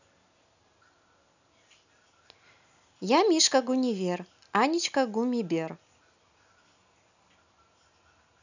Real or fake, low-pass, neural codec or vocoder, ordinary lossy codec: real; 7.2 kHz; none; none